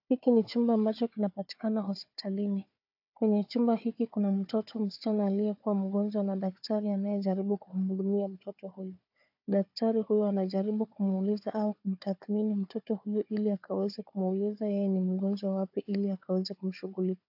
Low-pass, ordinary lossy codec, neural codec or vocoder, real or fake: 5.4 kHz; AAC, 48 kbps; codec, 16 kHz, 4 kbps, FunCodec, trained on Chinese and English, 50 frames a second; fake